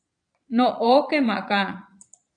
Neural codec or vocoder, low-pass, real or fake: vocoder, 22.05 kHz, 80 mel bands, Vocos; 9.9 kHz; fake